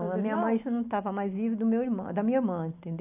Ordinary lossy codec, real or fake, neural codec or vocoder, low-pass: AAC, 32 kbps; real; none; 3.6 kHz